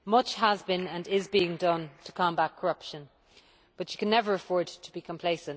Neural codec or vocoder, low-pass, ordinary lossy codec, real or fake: none; none; none; real